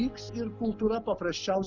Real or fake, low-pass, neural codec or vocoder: real; 7.2 kHz; none